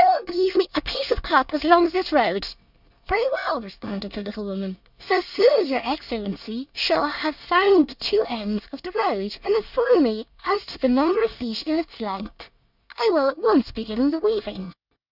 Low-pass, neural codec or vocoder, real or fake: 5.4 kHz; codec, 24 kHz, 1 kbps, SNAC; fake